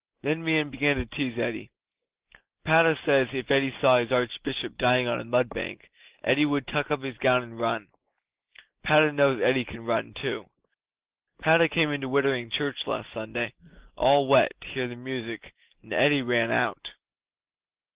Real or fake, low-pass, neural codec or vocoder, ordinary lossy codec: real; 3.6 kHz; none; Opus, 16 kbps